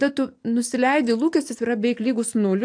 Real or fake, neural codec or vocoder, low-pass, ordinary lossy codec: real; none; 9.9 kHz; AAC, 64 kbps